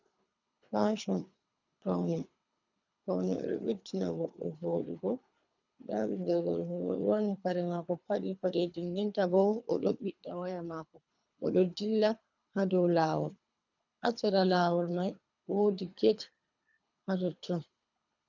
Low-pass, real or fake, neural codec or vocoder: 7.2 kHz; fake; codec, 24 kHz, 3 kbps, HILCodec